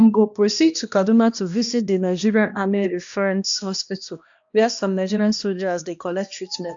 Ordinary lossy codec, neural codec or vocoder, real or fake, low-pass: AAC, 64 kbps; codec, 16 kHz, 1 kbps, X-Codec, HuBERT features, trained on balanced general audio; fake; 7.2 kHz